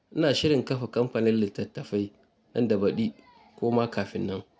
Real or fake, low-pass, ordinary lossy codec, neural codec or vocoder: real; none; none; none